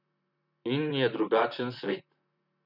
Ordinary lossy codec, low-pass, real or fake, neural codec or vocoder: none; 5.4 kHz; fake; vocoder, 44.1 kHz, 128 mel bands, Pupu-Vocoder